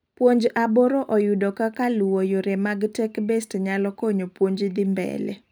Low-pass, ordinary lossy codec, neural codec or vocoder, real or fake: none; none; none; real